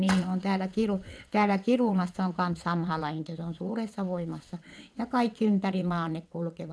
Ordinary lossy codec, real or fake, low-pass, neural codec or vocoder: none; fake; none; vocoder, 22.05 kHz, 80 mel bands, WaveNeXt